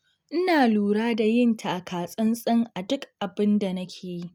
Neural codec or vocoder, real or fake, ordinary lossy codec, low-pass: none; real; none; 19.8 kHz